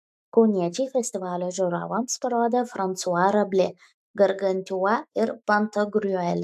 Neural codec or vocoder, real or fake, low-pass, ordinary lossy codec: autoencoder, 48 kHz, 128 numbers a frame, DAC-VAE, trained on Japanese speech; fake; 14.4 kHz; AAC, 96 kbps